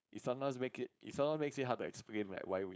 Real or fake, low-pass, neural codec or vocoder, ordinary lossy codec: fake; none; codec, 16 kHz, 4.8 kbps, FACodec; none